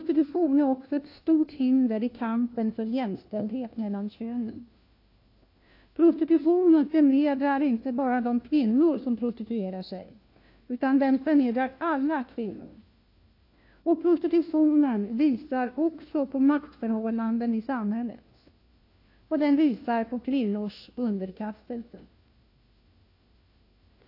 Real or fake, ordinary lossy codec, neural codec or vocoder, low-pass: fake; AAC, 32 kbps; codec, 16 kHz, 1 kbps, FunCodec, trained on LibriTTS, 50 frames a second; 5.4 kHz